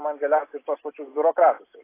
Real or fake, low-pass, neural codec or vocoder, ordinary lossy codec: real; 3.6 kHz; none; AAC, 24 kbps